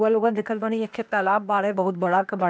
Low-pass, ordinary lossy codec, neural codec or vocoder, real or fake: none; none; codec, 16 kHz, 0.8 kbps, ZipCodec; fake